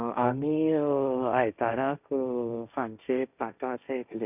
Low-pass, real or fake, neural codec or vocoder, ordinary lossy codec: 3.6 kHz; fake; codec, 16 kHz, 1.1 kbps, Voila-Tokenizer; none